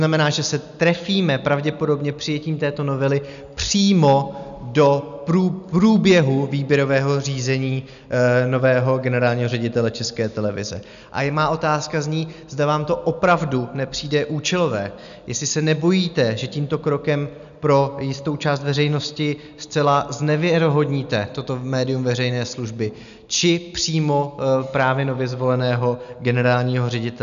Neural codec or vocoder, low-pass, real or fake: none; 7.2 kHz; real